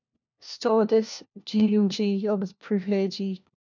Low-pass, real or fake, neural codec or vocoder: 7.2 kHz; fake; codec, 16 kHz, 1 kbps, FunCodec, trained on LibriTTS, 50 frames a second